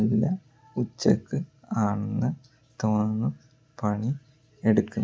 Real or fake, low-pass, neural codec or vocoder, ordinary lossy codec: real; none; none; none